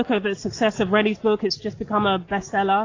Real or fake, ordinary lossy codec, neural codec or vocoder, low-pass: real; AAC, 32 kbps; none; 7.2 kHz